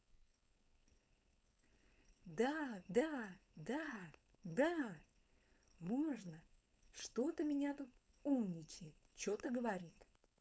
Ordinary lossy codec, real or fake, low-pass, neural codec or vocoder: none; fake; none; codec, 16 kHz, 4.8 kbps, FACodec